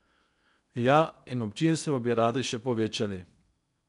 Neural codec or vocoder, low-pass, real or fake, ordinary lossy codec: codec, 16 kHz in and 24 kHz out, 0.8 kbps, FocalCodec, streaming, 65536 codes; 10.8 kHz; fake; none